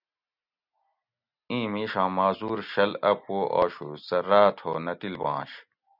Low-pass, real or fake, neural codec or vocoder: 5.4 kHz; real; none